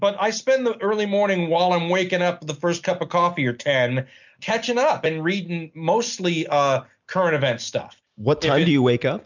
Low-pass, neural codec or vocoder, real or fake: 7.2 kHz; none; real